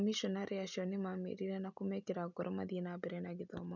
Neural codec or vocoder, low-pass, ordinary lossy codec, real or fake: none; 7.2 kHz; none; real